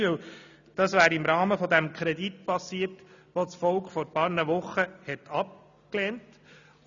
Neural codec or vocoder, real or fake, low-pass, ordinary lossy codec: none; real; 7.2 kHz; none